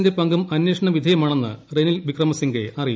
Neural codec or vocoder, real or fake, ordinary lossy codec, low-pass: none; real; none; none